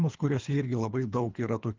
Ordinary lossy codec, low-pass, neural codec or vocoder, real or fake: Opus, 32 kbps; 7.2 kHz; codec, 24 kHz, 3 kbps, HILCodec; fake